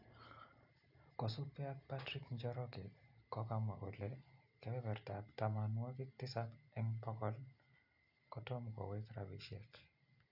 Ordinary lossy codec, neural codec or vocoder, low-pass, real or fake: none; none; 5.4 kHz; real